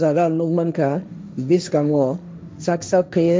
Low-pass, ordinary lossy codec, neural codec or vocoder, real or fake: none; none; codec, 16 kHz, 1.1 kbps, Voila-Tokenizer; fake